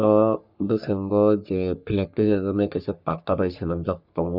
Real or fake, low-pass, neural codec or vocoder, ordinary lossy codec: fake; 5.4 kHz; codec, 44.1 kHz, 3.4 kbps, Pupu-Codec; none